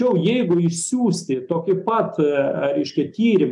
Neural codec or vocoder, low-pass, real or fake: none; 10.8 kHz; real